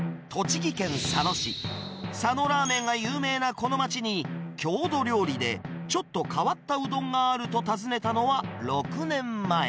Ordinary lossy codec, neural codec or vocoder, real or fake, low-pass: none; none; real; none